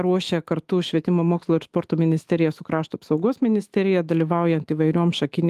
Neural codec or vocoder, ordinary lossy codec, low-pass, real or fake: autoencoder, 48 kHz, 128 numbers a frame, DAC-VAE, trained on Japanese speech; Opus, 24 kbps; 14.4 kHz; fake